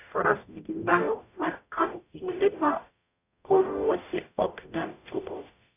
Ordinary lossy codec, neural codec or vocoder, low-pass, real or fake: none; codec, 44.1 kHz, 0.9 kbps, DAC; 3.6 kHz; fake